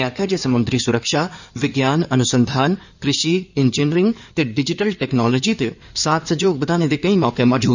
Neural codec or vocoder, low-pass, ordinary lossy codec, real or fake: codec, 16 kHz in and 24 kHz out, 2.2 kbps, FireRedTTS-2 codec; 7.2 kHz; none; fake